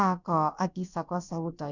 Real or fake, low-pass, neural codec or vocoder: fake; 7.2 kHz; codec, 16 kHz, about 1 kbps, DyCAST, with the encoder's durations